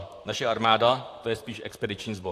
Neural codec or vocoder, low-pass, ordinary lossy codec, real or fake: vocoder, 44.1 kHz, 128 mel bands, Pupu-Vocoder; 14.4 kHz; AAC, 64 kbps; fake